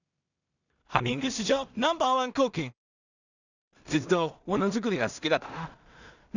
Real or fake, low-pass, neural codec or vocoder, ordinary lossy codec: fake; 7.2 kHz; codec, 16 kHz in and 24 kHz out, 0.4 kbps, LongCat-Audio-Codec, two codebook decoder; none